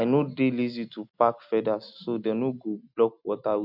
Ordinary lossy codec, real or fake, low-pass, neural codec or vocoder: none; real; 5.4 kHz; none